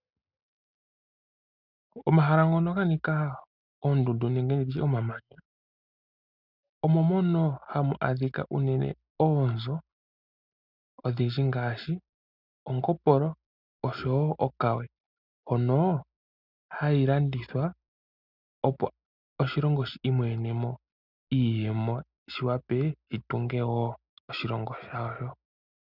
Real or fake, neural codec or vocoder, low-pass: real; none; 5.4 kHz